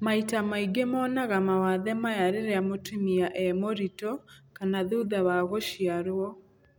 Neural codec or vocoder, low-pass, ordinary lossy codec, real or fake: none; none; none; real